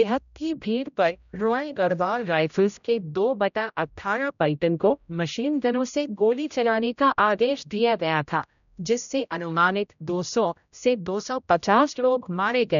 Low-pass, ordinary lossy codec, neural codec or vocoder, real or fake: 7.2 kHz; none; codec, 16 kHz, 0.5 kbps, X-Codec, HuBERT features, trained on general audio; fake